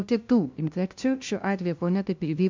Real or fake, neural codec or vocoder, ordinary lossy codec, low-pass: fake; codec, 16 kHz, 0.5 kbps, FunCodec, trained on LibriTTS, 25 frames a second; MP3, 64 kbps; 7.2 kHz